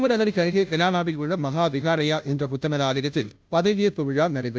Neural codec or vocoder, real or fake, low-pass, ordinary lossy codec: codec, 16 kHz, 0.5 kbps, FunCodec, trained on Chinese and English, 25 frames a second; fake; none; none